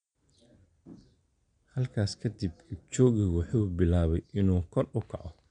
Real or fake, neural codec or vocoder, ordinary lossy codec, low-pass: real; none; MP3, 64 kbps; 9.9 kHz